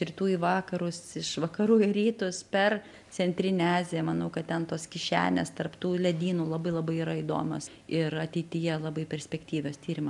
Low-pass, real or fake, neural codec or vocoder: 10.8 kHz; real; none